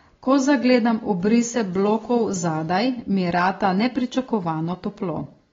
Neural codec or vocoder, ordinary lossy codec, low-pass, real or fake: none; AAC, 24 kbps; 7.2 kHz; real